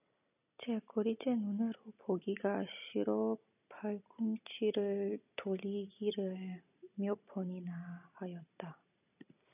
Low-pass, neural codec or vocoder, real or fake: 3.6 kHz; none; real